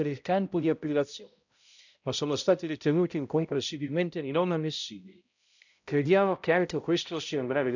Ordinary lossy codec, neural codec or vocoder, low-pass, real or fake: none; codec, 16 kHz, 0.5 kbps, X-Codec, HuBERT features, trained on balanced general audio; 7.2 kHz; fake